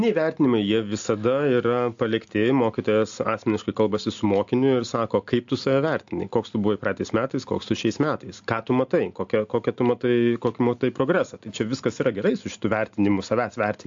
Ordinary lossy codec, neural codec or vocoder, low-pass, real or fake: AAC, 64 kbps; none; 7.2 kHz; real